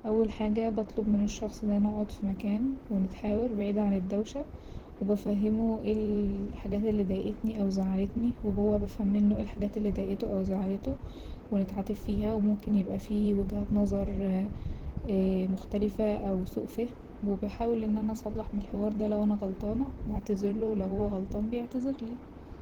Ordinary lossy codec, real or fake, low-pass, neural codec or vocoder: Opus, 16 kbps; fake; 19.8 kHz; vocoder, 44.1 kHz, 128 mel bands, Pupu-Vocoder